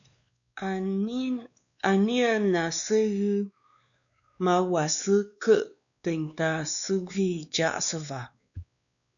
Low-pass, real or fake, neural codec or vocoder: 7.2 kHz; fake; codec, 16 kHz, 2 kbps, X-Codec, WavLM features, trained on Multilingual LibriSpeech